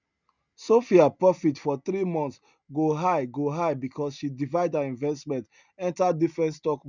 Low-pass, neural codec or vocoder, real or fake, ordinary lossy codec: 7.2 kHz; none; real; none